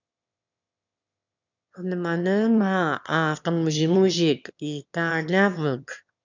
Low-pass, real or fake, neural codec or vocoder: 7.2 kHz; fake; autoencoder, 22.05 kHz, a latent of 192 numbers a frame, VITS, trained on one speaker